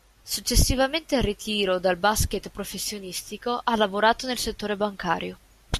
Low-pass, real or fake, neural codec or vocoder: 14.4 kHz; real; none